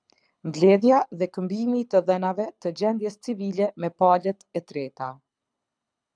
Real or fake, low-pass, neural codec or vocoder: fake; 9.9 kHz; codec, 24 kHz, 6 kbps, HILCodec